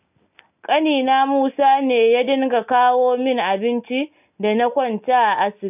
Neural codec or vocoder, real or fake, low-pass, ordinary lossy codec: none; real; 3.6 kHz; none